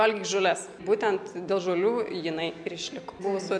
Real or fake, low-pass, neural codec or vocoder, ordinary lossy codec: real; 9.9 kHz; none; MP3, 64 kbps